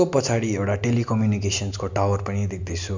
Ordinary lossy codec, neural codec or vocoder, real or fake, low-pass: MP3, 64 kbps; none; real; 7.2 kHz